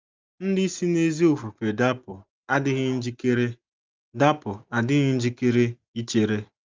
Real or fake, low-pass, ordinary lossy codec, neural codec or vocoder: real; 7.2 kHz; Opus, 24 kbps; none